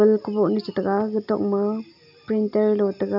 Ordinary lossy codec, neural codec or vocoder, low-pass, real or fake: none; none; 5.4 kHz; real